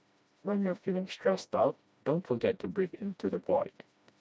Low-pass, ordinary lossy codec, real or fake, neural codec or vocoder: none; none; fake; codec, 16 kHz, 1 kbps, FreqCodec, smaller model